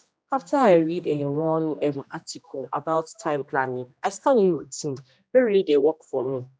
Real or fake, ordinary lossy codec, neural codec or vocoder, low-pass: fake; none; codec, 16 kHz, 1 kbps, X-Codec, HuBERT features, trained on general audio; none